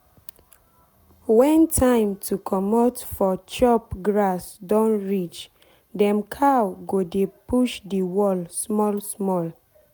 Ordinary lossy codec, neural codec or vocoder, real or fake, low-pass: none; none; real; none